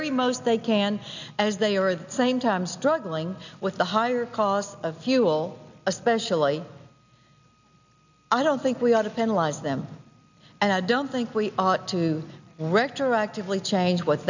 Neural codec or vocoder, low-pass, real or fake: none; 7.2 kHz; real